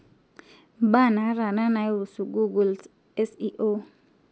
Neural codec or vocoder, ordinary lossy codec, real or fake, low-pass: none; none; real; none